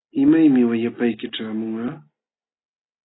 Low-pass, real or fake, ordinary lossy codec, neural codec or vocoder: 7.2 kHz; real; AAC, 16 kbps; none